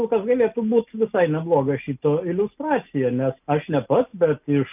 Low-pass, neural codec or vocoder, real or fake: 3.6 kHz; none; real